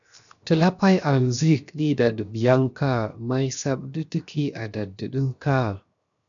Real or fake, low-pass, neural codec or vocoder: fake; 7.2 kHz; codec, 16 kHz, 0.7 kbps, FocalCodec